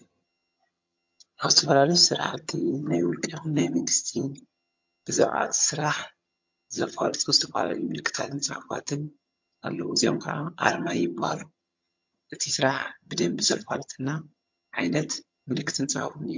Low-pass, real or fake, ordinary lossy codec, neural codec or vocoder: 7.2 kHz; fake; MP3, 48 kbps; vocoder, 22.05 kHz, 80 mel bands, HiFi-GAN